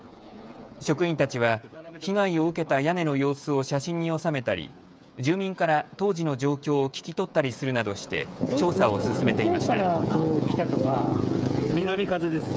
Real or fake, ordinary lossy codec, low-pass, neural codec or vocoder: fake; none; none; codec, 16 kHz, 8 kbps, FreqCodec, smaller model